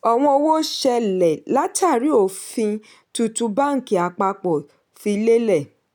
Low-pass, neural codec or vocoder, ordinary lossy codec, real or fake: none; none; none; real